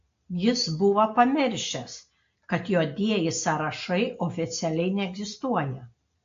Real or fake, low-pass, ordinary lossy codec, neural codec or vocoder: real; 7.2 kHz; AAC, 48 kbps; none